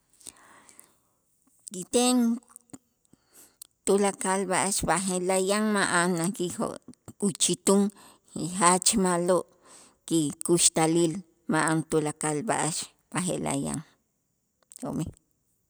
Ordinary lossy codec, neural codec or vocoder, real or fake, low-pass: none; none; real; none